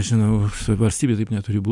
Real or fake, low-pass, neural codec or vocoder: real; 10.8 kHz; none